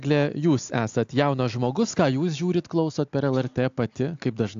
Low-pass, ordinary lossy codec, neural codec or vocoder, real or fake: 7.2 kHz; AAC, 64 kbps; none; real